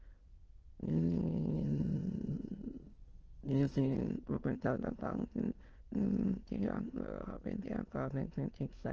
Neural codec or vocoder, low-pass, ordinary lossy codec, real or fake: autoencoder, 22.05 kHz, a latent of 192 numbers a frame, VITS, trained on many speakers; 7.2 kHz; Opus, 16 kbps; fake